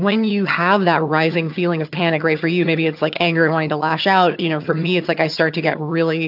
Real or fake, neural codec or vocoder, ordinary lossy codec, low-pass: fake; vocoder, 22.05 kHz, 80 mel bands, HiFi-GAN; AAC, 48 kbps; 5.4 kHz